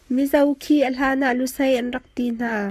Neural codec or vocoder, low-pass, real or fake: codec, 44.1 kHz, 7.8 kbps, Pupu-Codec; 14.4 kHz; fake